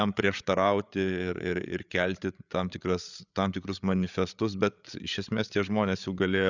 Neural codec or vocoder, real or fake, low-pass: codec, 16 kHz, 8 kbps, FreqCodec, larger model; fake; 7.2 kHz